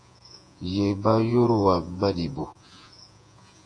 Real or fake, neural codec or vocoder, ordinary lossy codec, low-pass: fake; vocoder, 48 kHz, 128 mel bands, Vocos; AAC, 48 kbps; 9.9 kHz